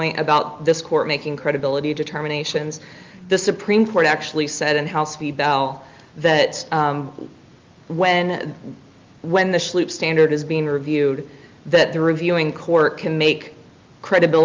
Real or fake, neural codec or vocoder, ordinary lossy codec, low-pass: real; none; Opus, 24 kbps; 7.2 kHz